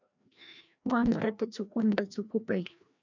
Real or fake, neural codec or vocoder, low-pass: fake; codec, 16 kHz, 1 kbps, FreqCodec, larger model; 7.2 kHz